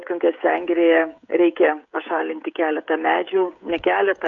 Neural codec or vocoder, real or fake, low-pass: codec, 16 kHz, 16 kbps, FreqCodec, smaller model; fake; 7.2 kHz